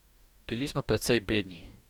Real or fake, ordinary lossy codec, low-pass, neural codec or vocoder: fake; none; 19.8 kHz; codec, 44.1 kHz, 2.6 kbps, DAC